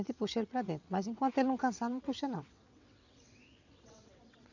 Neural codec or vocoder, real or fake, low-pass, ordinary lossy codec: vocoder, 22.05 kHz, 80 mel bands, WaveNeXt; fake; 7.2 kHz; none